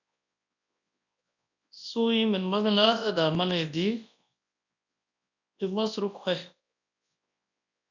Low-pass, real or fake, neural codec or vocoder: 7.2 kHz; fake; codec, 24 kHz, 0.9 kbps, WavTokenizer, large speech release